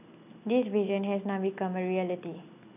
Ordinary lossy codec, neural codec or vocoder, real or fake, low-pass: none; none; real; 3.6 kHz